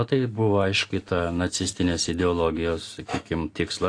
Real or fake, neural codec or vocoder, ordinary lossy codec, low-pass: real; none; AAC, 48 kbps; 9.9 kHz